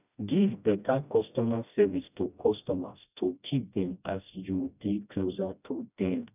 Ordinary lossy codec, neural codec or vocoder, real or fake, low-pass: none; codec, 16 kHz, 1 kbps, FreqCodec, smaller model; fake; 3.6 kHz